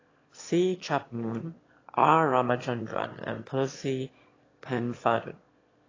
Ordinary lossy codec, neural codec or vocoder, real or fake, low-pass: AAC, 32 kbps; autoencoder, 22.05 kHz, a latent of 192 numbers a frame, VITS, trained on one speaker; fake; 7.2 kHz